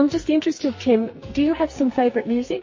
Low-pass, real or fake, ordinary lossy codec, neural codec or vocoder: 7.2 kHz; fake; MP3, 32 kbps; codec, 44.1 kHz, 2.6 kbps, SNAC